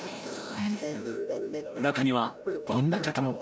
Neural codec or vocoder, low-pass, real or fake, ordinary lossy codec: codec, 16 kHz, 0.5 kbps, FreqCodec, larger model; none; fake; none